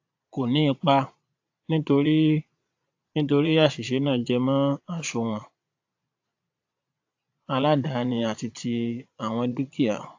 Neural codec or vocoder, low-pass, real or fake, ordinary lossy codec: vocoder, 22.05 kHz, 80 mel bands, Vocos; 7.2 kHz; fake; AAC, 48 kbps